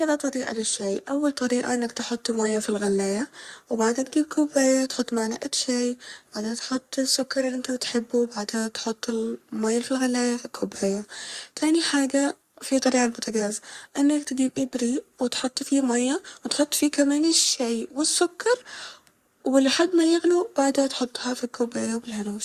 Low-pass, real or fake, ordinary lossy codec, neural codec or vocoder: 14.4 kHz; fake; Opus, 64 kbps; codec, 44.1 kHz, 3.4 kbps, Pupu-Codec